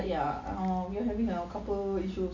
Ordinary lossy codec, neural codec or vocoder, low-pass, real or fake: none; none; 7.2 kHz; real